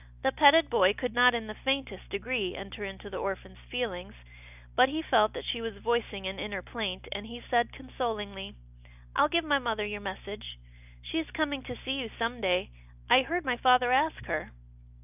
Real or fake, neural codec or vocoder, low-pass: real; none; 3.6 kHz